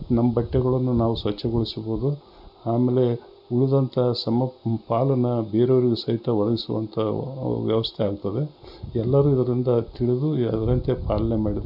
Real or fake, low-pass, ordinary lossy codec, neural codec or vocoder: real; 5.4 kHz; none; none